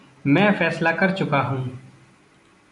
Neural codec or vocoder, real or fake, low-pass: none; real; 10.8 kHz